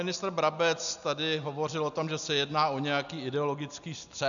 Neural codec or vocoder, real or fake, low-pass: none; real; 7.2 kHz